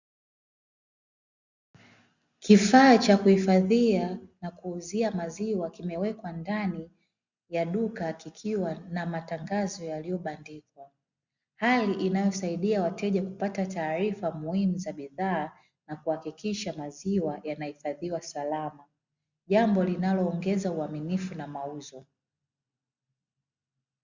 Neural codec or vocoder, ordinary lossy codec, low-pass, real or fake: none; Opus, 64 kbps; 7.2 kHz; real